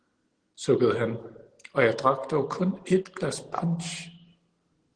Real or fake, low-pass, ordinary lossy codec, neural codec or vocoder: real; 9.9 kHz; Opus, 16 kbps; none